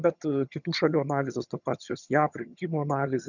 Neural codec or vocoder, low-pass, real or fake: vocoder, 22.05 kHz, 80 mel bands, HiFi-GAN; 7.2 kHz; fake